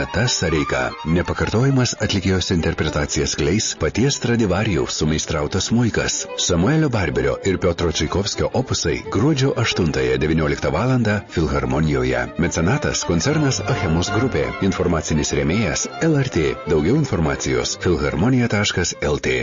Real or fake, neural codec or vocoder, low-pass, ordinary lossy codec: real; none; 7.2 kHz; MP3, 32 kbps